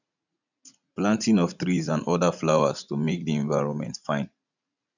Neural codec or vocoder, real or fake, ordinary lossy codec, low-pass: vocoder, 44.1 kHz, 80 mel bands, Vocos; fake; none; 7.2 kHz